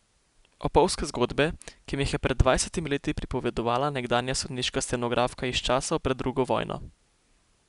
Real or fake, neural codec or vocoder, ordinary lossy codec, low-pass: real; none; none; 10.8 kHz